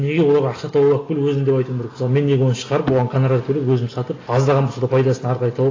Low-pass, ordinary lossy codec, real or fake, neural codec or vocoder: 7.2 kHz; AAC, 32 kbps; real; none